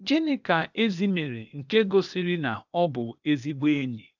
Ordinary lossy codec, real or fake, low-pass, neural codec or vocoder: none; fake; 7.2 kHz; codec, 16 kHz, 0.8 kbps, ZipCodec